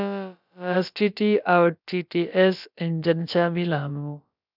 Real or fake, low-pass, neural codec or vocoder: fake; 5.4 kHz; codec, 16 kHz, about 1 kbps, DyCAST, with the encoder's durations